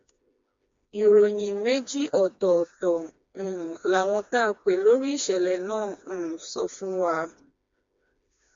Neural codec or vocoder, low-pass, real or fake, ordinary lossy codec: codec, 16 kHz, 2 kbps, FreqCodec, smaller model; 7.2 kHz; fake; MP3, 48 kbps